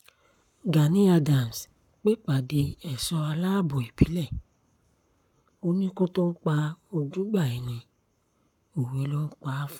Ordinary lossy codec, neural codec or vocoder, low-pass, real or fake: none; codec, 44.1 kHz, 7.8 kbps, Pupu-Codec; 19.8 kHz; fake